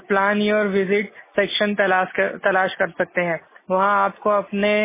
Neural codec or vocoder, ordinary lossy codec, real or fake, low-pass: none; MP3, 16 kbps; real; 3.6 kHz